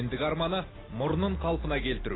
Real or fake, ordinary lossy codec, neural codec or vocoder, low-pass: real; AAC, 16 kbps; none; 7.2 kHz